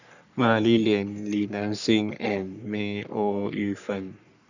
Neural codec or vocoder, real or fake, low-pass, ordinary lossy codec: codec, 44.1 kHz, 3.4 kbps, Pupu-Codec; fake; 7.2 kHz; none